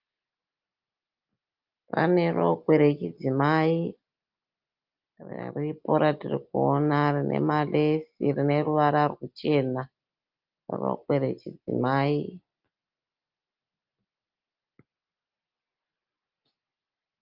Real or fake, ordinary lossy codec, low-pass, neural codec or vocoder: real; Opus, 24 kbps; 5.4 kHz; none